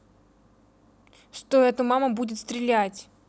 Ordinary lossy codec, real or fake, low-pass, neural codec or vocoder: none; real; none; none